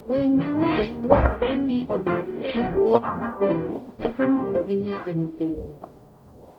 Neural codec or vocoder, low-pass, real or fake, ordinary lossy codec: codec, 44.1 kHz, 0.9 kbps, DAC; 19.8 kHz; fake; none